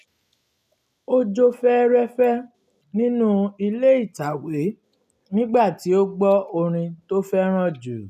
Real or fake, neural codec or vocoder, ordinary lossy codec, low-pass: real; none; none; 14.4 kHz